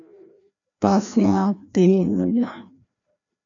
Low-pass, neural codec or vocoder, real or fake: 7.2 kHz; codec, 16 kHz, 1 kbps, FreqCodec, larger model; fake